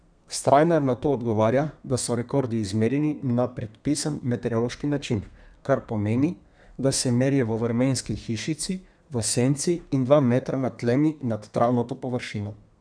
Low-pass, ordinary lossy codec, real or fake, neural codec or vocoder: 9.9 kHz; none; fake; codec, 32 kHz, 1.9 kbps, SNAC